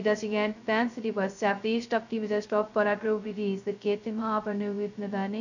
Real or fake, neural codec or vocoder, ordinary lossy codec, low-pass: fake; codec, 16 kHz, 0.2 kbps, FocalCodec; none; 7.2 kHz